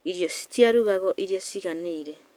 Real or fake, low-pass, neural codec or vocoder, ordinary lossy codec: fake; 19.8 kHz; codec, 44.1 kHz, 7.8 kbps, DAC; none